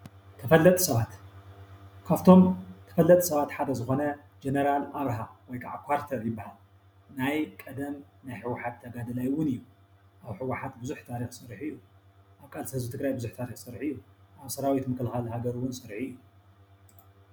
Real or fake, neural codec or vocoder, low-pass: real; none; 19.8 kHz